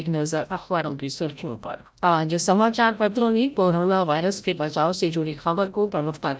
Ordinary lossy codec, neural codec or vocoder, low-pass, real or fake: none; codec, 16 kHz, 0.5 kbps, FreqCodec, larger model; none; fake